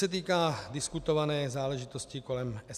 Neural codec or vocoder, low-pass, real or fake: none; 14.4 kHz; real